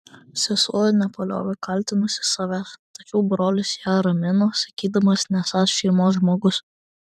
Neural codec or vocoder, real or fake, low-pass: vocoder, 44.1 kHz, 128 mel bands every 512 samples, BigVGAN v2; fake; 14.4 kHz